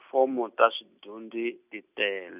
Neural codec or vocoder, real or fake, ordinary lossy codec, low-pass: none; real; none; 3.6 kHz